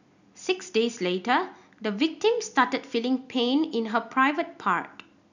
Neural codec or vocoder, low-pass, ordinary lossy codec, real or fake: none; 7.2 kHz; none; real